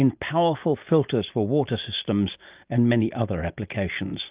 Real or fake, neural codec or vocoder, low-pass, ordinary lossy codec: real; none; 3.6 kHz; Opus, 32 kbps